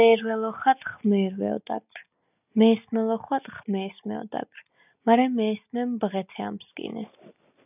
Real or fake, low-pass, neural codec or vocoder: real; 3.6 kHz; none